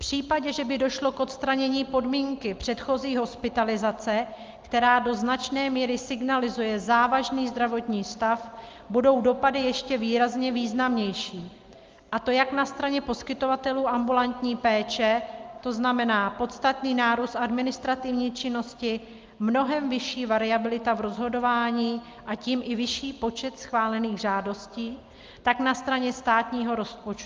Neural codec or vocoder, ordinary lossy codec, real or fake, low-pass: none; Opus, 32 kbps; real; 7.2 kHz